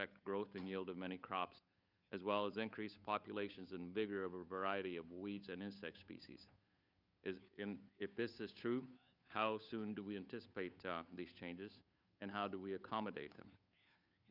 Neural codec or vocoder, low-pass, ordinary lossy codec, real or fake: codec, 16 kHz, 8 kbps, FunCodec, trained on Chinese and English, 25 frames a second; 5.4 kHz; Opus, 64 kbps; fake